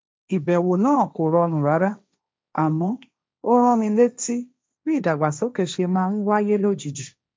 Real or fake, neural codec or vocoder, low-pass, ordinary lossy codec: fake; codec, 16 kHz, 1.1 kbps, Voila-Tokenizer; none; none